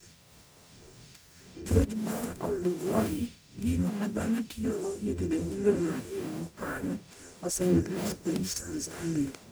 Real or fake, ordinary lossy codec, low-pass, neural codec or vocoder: fake; none; none; codec, 44.1 kHz, 0.9 kbps, DAC